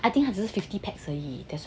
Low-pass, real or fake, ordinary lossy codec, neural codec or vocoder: none; real; none; none